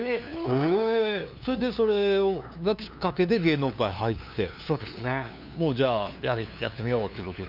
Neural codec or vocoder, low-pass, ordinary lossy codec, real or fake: codec, 16 kHz, 2 kbps, FunCodec, trained on LibriTTS, 25 frames a second; 5.4 kHz; none; fake